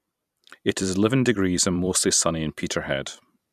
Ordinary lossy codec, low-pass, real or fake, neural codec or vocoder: none; 14.4 kHz; fake; vocoder, 44.1 kHz, 128 mel bands every 256 samples, BigVGAN v2